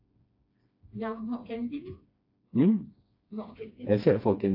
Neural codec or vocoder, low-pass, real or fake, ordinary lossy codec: codec, 16 kHz, 2 kbps, FreqCodec, smaller model; 5.4 kHz; fake; AAC, 32 kbps